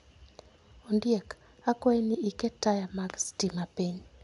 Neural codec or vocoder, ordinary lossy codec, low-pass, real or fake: none; none; 10.8 kHz; real